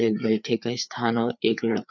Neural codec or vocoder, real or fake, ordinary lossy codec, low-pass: codec, 16 kHz, 4 kbps, FreqCodec, larger model; fake; none; 7.2 kHz